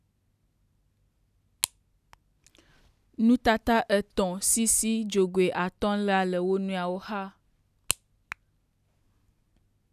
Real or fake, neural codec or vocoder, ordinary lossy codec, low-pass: real; none; none; 14.4 kHz